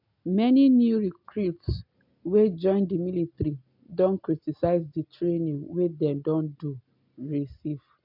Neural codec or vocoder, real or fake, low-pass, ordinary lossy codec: none; real; 5.4 kHz; MP3, 48 kbps